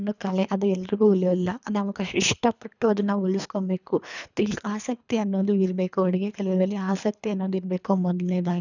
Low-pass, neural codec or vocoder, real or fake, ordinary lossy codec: 7.2 kHz; codec, 24 kHz, 3 kbps, HILCodec; fake; none